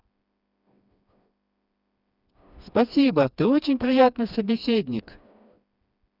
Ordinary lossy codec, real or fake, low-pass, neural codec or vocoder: none; fake; 5.4 kHz; codec, 16 kHz, 2 kbps, FreqCodec, smaller model